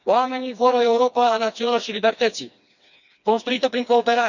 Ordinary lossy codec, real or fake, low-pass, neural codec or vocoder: none; fake; 7.2 kHz; codec, 16 kHz, 2 kbps, FreqCodec, smaller model